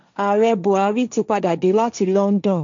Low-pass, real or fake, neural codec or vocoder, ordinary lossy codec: 7.2 kHz; fake; codec, 16 kHz, 1.1 kbps, Voila-Tokenizer; MP3, 64 kbps